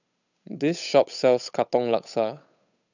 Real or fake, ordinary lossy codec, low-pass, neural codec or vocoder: fake; none; 7.2 kHz; codec, 16 kHz, 8 kbps, FunCodec, trained on Chinese and English, 25 frames a second